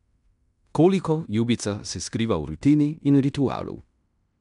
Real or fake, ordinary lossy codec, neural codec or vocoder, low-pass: fake; MP3, 96 kbps; codec, 16 kHz in and 24 kHz out, 0.9 kbps, LongCat-Audio-Codec, fine tuned four codebook decoder; 10.8 kHz